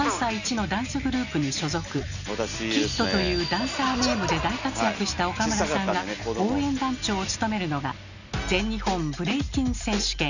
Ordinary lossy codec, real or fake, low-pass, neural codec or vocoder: none; real; 7.2 kHz; none